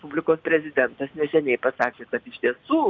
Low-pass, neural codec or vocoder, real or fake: 7.2 kHz; none; real